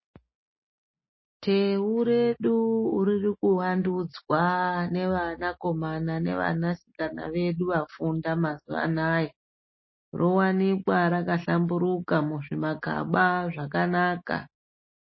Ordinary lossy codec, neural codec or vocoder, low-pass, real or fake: MP3, 24 kbps; none; 7.2 kHz; real